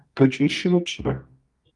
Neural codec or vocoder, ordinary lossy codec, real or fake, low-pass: codec, 24 kHz, 0.9 kbps, WavTokenizer, medium music audio release; Opus, 24 kbps; fake; 10.8 kHz